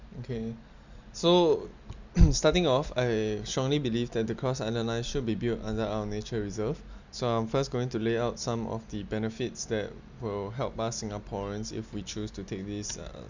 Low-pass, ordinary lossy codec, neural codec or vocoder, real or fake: 7.2 kHz; Opus, 64 kbps; none; real